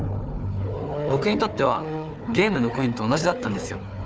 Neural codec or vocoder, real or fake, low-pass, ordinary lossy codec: codec, 16 kHz, 4 kbps, FunCodec, trained on Chinese and English, 50 frames a second; fake; none; none